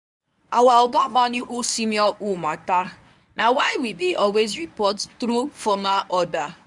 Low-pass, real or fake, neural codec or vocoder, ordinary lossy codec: 10.8 kHz; fake; codec, 24 kHz, 0.9 kbps, WavTokenizer, medium speech release version 1; none